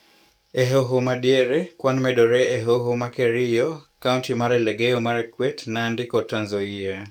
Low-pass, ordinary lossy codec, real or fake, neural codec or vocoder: 19.8 kHz; none; fake; codec, 44.1 kHz, 7.8 kbps, DAC